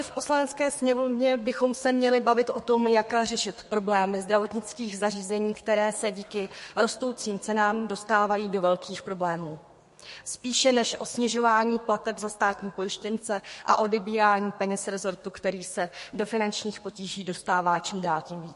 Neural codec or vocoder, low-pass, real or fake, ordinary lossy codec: codec, 32 kHz, 1.9 kbps, SNAC; 14.4 kHz; fake; MP3, 48 kbps